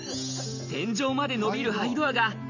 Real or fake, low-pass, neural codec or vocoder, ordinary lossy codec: real; 7.2 kHz; none; none